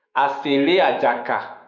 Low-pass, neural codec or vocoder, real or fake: 7.2 kHz; autoencoder, 48 kHz, 128 numbers a frame, DAC-VAE, trained on Japanese speech; fake